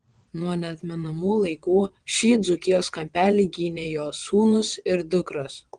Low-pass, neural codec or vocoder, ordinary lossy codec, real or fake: 9.9 kHz; vocoder, 22.05 kHz, 80 mel bands, WaveNeXt; Opus, 24 kbps; fake